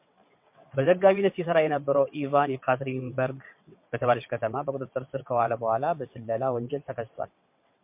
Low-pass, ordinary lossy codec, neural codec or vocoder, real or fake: 3.6 kHz; MP3, 32 kbps; vocoder, 22.05 kHz, 80 mel bands, WaveNeXt; fake